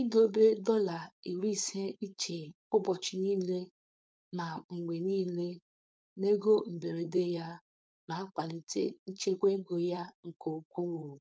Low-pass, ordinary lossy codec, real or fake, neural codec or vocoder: none; none; fake; codec, 16 kHz, 4.8 kbps, FACodec